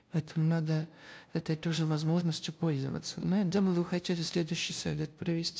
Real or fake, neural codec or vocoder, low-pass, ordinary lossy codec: fake; codec, 16 kHz, 0.5 kbps, FunCodec, trained on LibriTTS, 25 frames a second; none; none